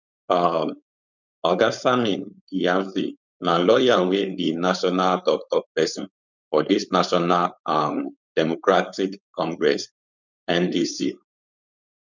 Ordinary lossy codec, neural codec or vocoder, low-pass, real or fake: none; codec, 16 kHz, 4.8 kbps, FACodec; 7.2 kHz; fake